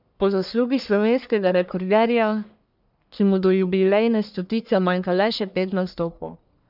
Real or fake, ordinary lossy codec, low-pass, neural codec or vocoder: fake; none; 5.4 kHz; codec, 44.1 kHz, 1.7 kbps, Pupu-Codec